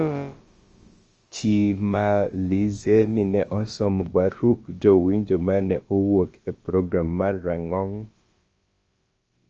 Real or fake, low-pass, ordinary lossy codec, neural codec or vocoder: fake; 7.2 kHz; Opus, 24 kbps; codec, 16 kHz, about 1 kbps, DyCAST, with the encoder's durations